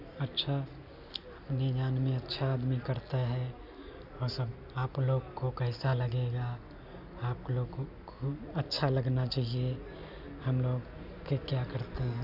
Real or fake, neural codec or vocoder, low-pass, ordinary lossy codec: real; none; 5.4 kHz; none